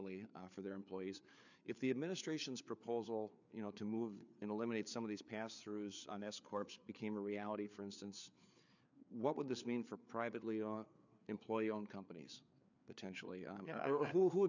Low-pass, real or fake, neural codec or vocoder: 7.2 kHz; fake; codec, 16 kHz, 4 kbps, FreqCodec, larger model